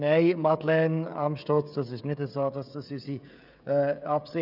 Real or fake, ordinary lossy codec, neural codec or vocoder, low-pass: fake; none; codec, 16 kHz, 16 kbps, FreqCodec, smaller model; 5.4 kHz